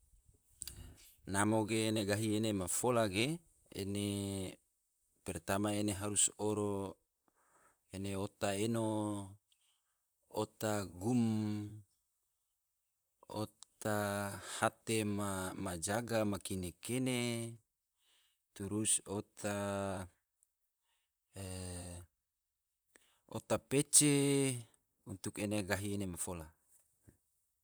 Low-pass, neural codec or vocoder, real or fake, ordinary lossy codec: none; vocoder, 44.1 kHz, 128 mel bands, Pupu-Vocoder; fake; none